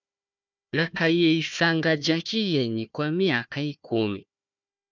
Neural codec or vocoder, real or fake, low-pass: codec, 16 kHz, 1 kbps, FunCodec, trained on Chinese and English, 50 frames a second; fake; 7.2 kHz